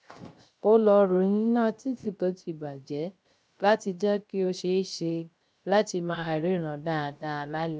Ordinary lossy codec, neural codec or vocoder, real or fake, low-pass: none; codec, 16 kHz, 0.3 kbps, FocalCodec; fake; none